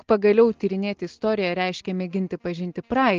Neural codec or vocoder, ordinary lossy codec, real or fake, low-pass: none; Opus, 16 kbps; real; 7.2 kHz